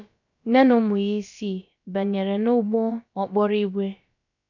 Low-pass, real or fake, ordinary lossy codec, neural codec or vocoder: 7.2 kHz; fake; none; codec, 16 kHz, about 1 kbps, DyCAST, with the encoder's durations